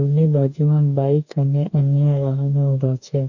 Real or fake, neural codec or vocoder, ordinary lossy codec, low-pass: fake; codec, 44.1 kHz, 2.6 kbps, DAC; none; 7.2 kHz